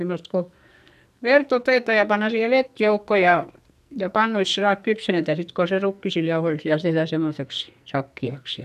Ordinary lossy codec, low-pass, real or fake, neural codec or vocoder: none; 14.4 kHz; fake; codec, 44.1 kHz, 2.6 kbps, SNAC